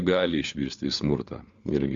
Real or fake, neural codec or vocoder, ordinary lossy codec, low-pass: fake; codec, 16 kHz, 4 kbps, FunCodec, trained on LibriTTS, 50 frames a second; Opus, 64 kbps; 7.2 kHz